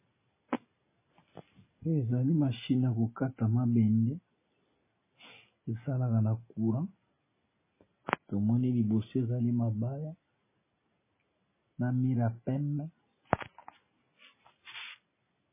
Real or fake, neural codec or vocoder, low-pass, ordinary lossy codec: real; none; 3.6 kHz; MP3, 16 kbps